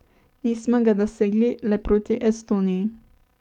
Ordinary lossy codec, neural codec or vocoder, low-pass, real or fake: none; codec, 44.1 kHz, 7.8 kbps, DAC; 19.8 kHz; fake